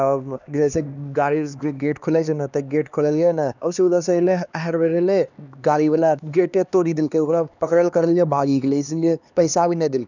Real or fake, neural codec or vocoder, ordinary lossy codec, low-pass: fake; codec, 16 kHz, 2 kbps, X-Codec, HuBERT features, trained on LibriSpeech; none; 7.2 kHz